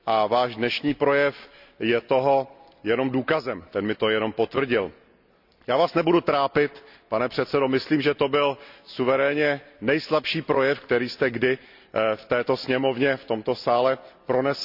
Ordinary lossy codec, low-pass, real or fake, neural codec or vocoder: none; 5.4 kHz; real; none